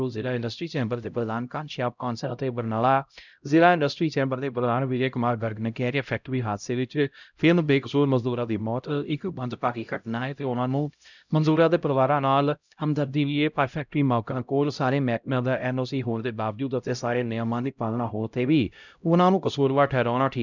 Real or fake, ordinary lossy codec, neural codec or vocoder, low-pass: fake; none; codec, 16 kHz, 0.5 kbps, X-Codec, HuBERT features, trained on LibriSpeech; 7.2 kHz